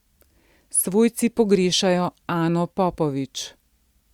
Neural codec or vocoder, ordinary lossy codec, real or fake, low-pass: none; Opus, 64 kbps; real; 19.8 kHz